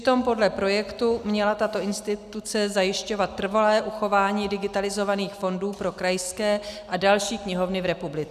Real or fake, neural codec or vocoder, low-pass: real; none; 14.4 kHz